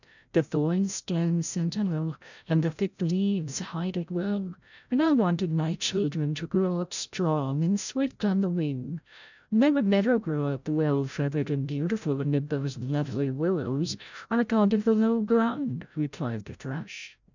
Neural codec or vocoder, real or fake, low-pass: codec, 16 kHz, 0.5 kbps, FreqCodec, larger model; fake; 7.2 kHz